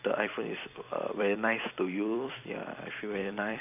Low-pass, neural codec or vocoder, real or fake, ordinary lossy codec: 3.6 kHz; vocoder, 44.1 kHz, 128 mel bands every 256 samples, BigVGAN v2; fake; none